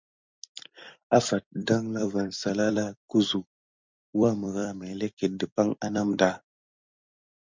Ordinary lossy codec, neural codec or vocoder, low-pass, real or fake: MP3, 64 kbps; vocoder, 44.1 kHz, 128 mel bands every 256 samples, BigVGAN v2; 7.2 kHz; fake